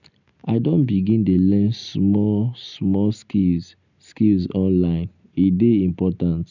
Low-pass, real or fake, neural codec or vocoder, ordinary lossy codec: 7.2 kHz; real; none; none